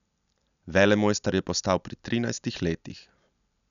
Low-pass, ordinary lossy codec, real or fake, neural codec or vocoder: 7.2 kHz; none; real; none